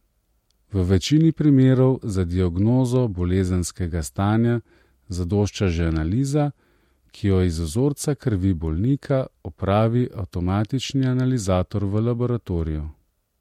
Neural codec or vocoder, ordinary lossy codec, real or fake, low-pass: none; MP3, 64 kbps; real; 19.8 kHz